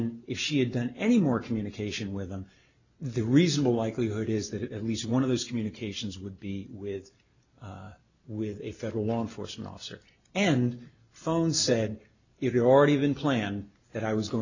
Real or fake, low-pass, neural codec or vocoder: real; 7.2 kHz; none